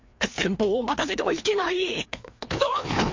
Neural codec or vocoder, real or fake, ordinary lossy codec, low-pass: codec, 16 kHz, 2 kbps, FreqCodec, larger model; fake; MP3, 48 kbps; 7.2 kHz